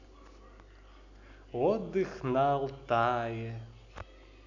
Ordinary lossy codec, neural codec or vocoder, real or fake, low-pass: none; none; real; 7.2 kHz